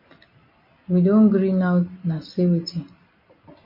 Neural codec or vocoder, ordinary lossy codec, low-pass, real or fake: none; MP3, 24 kbps; 5.4 kHz; real